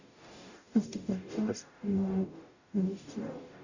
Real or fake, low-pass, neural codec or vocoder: fake; 7.2 kHz; codec, 44.1 kHz, 0.9 kbps, DAC